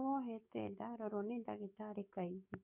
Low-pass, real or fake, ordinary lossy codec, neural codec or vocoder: 3.6 kHz; fake; none; codec, 44.1 kHz, 7.8 kbps, DAC